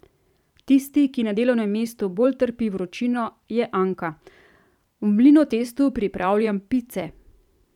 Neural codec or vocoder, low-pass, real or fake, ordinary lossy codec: vocoder, 44.1 kHz, 128 mel bands every 512 samples, BigVGAN v2; 19.8 kHz; fake; none